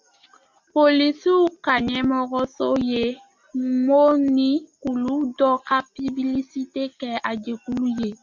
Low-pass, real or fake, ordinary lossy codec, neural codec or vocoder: 7.2 kHz; real; MP3, 64 kbps; none